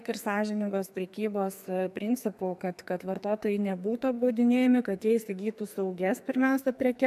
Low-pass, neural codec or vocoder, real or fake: 14.4 kHz; codec, 44.1 kHz, 2.6 kbps, SNAC; fake